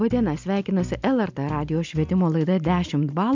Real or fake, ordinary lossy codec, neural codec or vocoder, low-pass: real; MP3, 64 kbps; none; 7.2 kHz